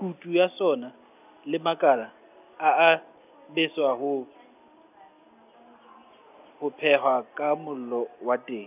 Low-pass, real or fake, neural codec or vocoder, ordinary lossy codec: 3.6 kHz; real; none; none